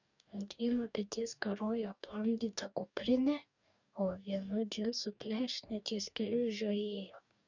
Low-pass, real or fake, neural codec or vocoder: 7.2 kHz; fake; codec, 44.1 kHz, 2.6 kbps, DAC